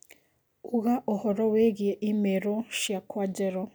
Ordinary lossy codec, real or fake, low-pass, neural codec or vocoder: none; real; none; none